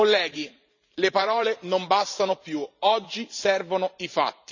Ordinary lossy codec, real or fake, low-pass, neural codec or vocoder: none; fake; 7.2 kHz; vocoder, 44.1 kHz, 128 mel bands every 512 samples, BigVGAN v2